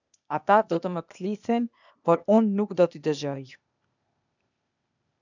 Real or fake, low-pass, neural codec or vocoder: fake; 7.2 kHz; codec, 16 kHz, 0.8 kbps, ZipCodec